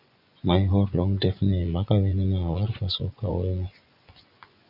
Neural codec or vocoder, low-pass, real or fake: vocoder, 24 kHz, 100 mel bands, Vocos; 5.4 kHz; fake